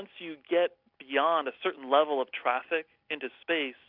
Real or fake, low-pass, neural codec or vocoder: real; 5.4 kHz; none